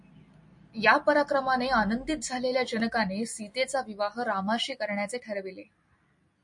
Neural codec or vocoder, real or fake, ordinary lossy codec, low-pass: none; real; MP3, 48 kbps; 10.8 kHz